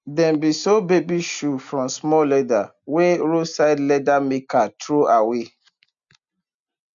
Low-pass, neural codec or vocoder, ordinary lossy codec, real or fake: 7.2 kHz; none; MP3, 64 kbps; real